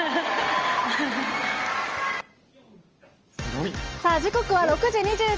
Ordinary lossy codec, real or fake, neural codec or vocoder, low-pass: Opus, 24 kbps; real; none; 7.2 kHz